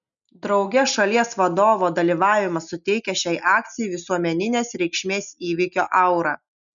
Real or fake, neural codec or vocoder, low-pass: real; none; 7.2 kHz